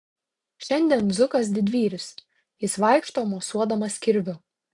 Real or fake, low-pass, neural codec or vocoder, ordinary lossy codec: real; 10.8 kHz; none; AAC, 64 kbps